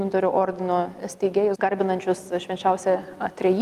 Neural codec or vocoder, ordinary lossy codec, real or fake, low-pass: none; Opus, 32 kbps; real; 14.4 kHz